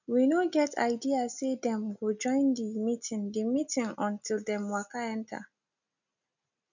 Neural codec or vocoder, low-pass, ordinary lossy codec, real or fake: none; 7.2 kHz; none; real